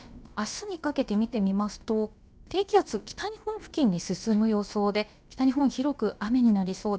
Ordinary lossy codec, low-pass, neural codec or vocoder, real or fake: none; none; codec, 16 kHz, about 1 kbps, DyCAST, with the encoder's durations; fake